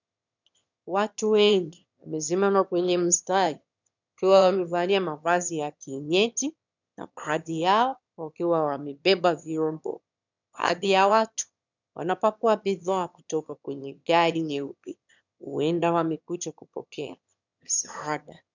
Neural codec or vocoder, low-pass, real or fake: autoencoder, 22.05 kHz, a latent of 192 numbers a frame, VITS, trained on one speaker; 7.2 kHz; fake